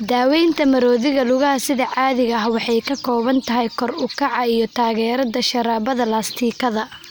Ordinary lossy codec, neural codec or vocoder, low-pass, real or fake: none; none; none; real